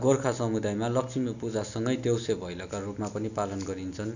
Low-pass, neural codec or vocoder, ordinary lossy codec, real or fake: 7.2 kHz; none; none; real